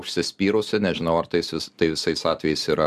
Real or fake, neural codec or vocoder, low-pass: real; none; 14.4 kHz